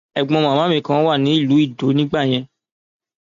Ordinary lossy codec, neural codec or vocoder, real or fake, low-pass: none; none; real; 7.2 kHz